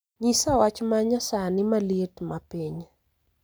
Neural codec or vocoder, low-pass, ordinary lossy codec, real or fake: none; none; none; real